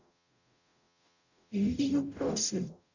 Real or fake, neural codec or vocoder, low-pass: fake; codec, 44.1 kHz, 0.9 kbps, DAC; 7.2 kHz